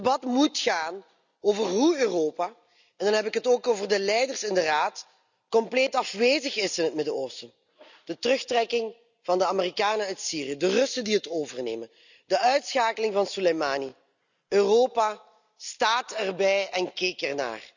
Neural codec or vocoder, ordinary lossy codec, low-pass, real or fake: none; none; 7.2 kHz; real